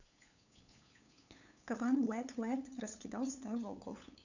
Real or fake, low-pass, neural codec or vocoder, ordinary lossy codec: fake; 7.2 kHz; codec, 16 kHz, 8 kbps, FunCodec, trained on LibriTTS, 25 frames a second; none